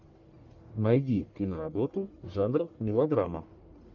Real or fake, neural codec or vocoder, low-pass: fake; codec, 44.1 kHz, 1.7 kbps, Pupu-Codec; 7.2 kHz